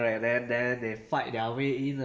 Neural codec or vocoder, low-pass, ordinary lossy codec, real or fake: none; none; none; real